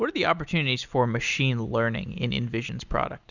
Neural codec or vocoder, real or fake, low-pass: none; real; 7.2 kHz